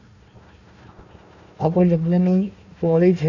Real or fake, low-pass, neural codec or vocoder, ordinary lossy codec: fake; 7.2 kHz; codec, 16 kHz, 1 kbps, FunCodec, trained on Chinese and English, 50 frames a second; none